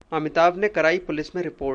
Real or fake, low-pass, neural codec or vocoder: real; 9.9 kHz; none